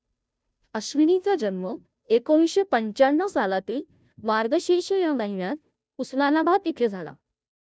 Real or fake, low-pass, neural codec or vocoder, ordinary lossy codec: fake; none; codec, 16 kHz, 0.5 kbps, FunCodec, trained on Chinese and English, 25 frames a second; none